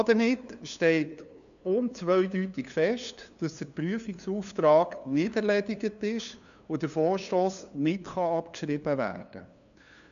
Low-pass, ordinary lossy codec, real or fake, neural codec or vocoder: 7.2 kHz; none; fake; codec, 16 kHz, 2 kbps, FunCodec, trained on LibriTTS, 25 frames a second